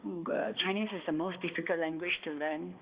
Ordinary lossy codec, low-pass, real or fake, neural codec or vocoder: Opus, 64 kbps; 3.6 kHz; fake; codec, 16 kHz, 2 kbps, X-Codec, HuBERT features, trained on balanced general audio